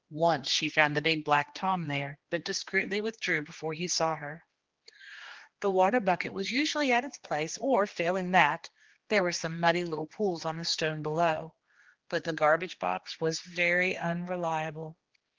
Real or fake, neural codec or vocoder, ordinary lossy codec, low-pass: fake; codec, 16 kHz, 2 kbps, X-Codec, HuBERT features, trained on general audio; Opus, 16 kbps; 7.2 kHz